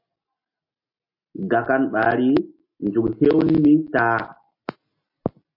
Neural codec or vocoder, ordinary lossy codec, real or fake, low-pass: none; MP3, 32 kbps; real; 5.4 kHz